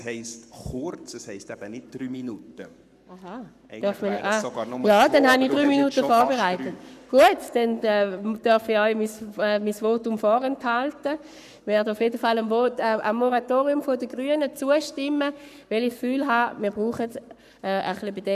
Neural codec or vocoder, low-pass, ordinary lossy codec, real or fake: codec, 44.1 kHz, 7.8 kbps, Pupu-Codec; 14.4 kHz; none; fake